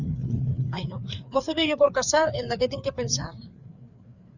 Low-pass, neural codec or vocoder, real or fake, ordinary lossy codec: 7.2 kHz; codec, 16 kHz, 4 kbps, FreqCodec, larger model; fake; Opus, 64 kbps